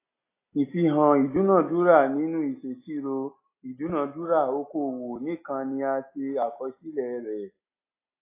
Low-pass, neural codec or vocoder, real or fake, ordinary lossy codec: 3.6 kHz; none; real; AAC, 24 kbps